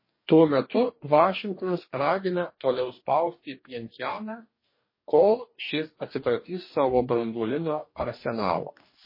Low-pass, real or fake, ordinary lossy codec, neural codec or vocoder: 5.4 kHz; fake; MP3, 24 kbps; codec, 44.1 kHz, 2.6 kbps, DAC